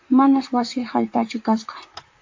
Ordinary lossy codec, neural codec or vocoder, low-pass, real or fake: AAC, 48 kbps; vocoder, 44.1 kHz, 128 mel bands, Pupu-Vocoder; 7.2 kHz; fake